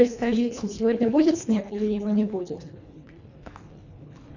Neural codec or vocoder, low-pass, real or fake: codec, 24 kHz, 1.5 kbps, HILCodec; 7.2 kHz; fake